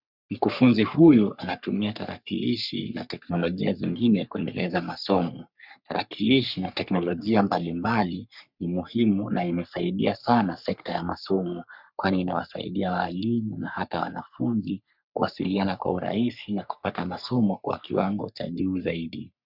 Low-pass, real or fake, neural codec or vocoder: 5.4 kHz; fake; codec, 44.1 kHz, 3.4 kbps, Pupu-Codec